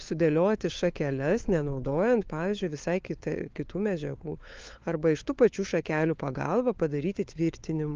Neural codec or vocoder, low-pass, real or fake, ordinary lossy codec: codec, 16 kHz, 4 kbps, FunCodec, trained on LibriTTS, 50 frames a second; 7.2 kHz; fake; Opus, 32 kbps